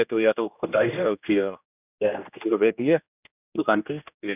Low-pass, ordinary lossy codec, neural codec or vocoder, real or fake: 3.6 kHz; none; codec, 16 kHz, 1 kbps, X-Codec, HuBERT features, trained on general audio; fake